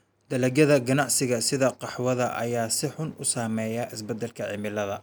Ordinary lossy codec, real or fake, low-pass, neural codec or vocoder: none; real; none; none